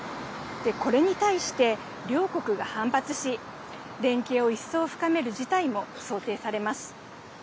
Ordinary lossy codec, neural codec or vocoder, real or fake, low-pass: none; none; real; none